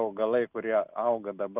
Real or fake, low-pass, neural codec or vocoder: real; 3.6 kHz; none